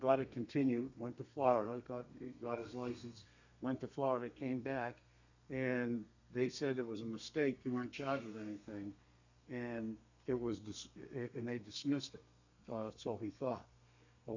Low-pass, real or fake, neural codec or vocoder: 7.2 kHz; fake; codec, 32 kHz, 1.9 kbps, SNAC